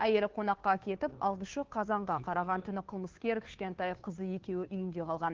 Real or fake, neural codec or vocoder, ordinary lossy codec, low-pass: fake; codec, 16 kHz, 2 kbps, FunCodec, trained on Chinese and English, 25 frames a second; Opus, 16 kbps; 7.2 kHz